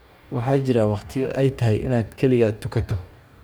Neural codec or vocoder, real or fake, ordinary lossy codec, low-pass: codec, 44.1 kHz, 2.6 kbps, DAC; fake; none; none